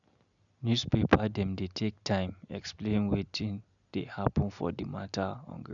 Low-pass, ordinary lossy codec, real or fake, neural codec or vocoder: 7.2 kHz; none; real; none